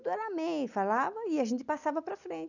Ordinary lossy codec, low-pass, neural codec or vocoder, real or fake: none; 7.2 kHz; none; real